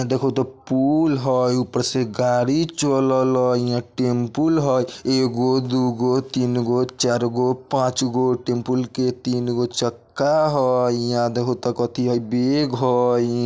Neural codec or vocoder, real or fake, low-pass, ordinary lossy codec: none; real; none; none